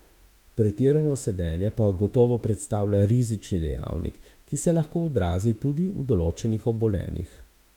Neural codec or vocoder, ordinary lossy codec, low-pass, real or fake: autoencoder, 48 kHz, 32 numbers a frame, DAC-VAE, trained on Japanese speech; MP3, 96 kbps; 19.8 kHz; fake